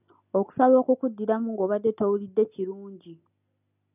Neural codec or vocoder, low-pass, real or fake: none; 3.6 kHz; real